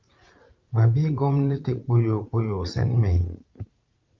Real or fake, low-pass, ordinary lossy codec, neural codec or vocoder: fake; 7.2 kHz; Opus, 32 kbps; vocoder, 44.1 kHz, 80 mel bands, Vocos